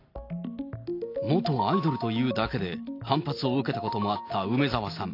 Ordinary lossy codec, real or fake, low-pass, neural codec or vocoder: AAC, 32 kbps; real; 5.4 kHz; none